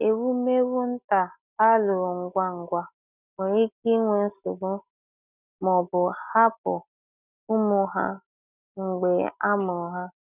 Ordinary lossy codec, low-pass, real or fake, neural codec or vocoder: none; 3.6 kHz; real; none